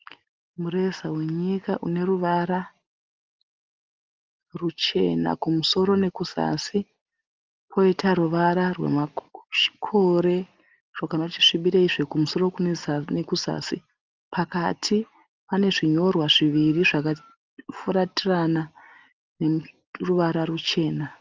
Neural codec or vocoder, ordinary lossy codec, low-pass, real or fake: none; Opus, 32 kbps; 7.2 kHz; real